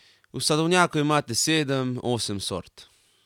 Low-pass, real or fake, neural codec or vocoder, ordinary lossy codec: 19.8 kHz; real; none; none